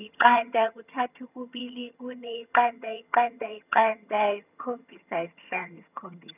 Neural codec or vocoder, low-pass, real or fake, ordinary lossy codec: vocoder, 22.05 kHz, 80 mel bands, HiFi-GAN; 3.6 kHz; fake; none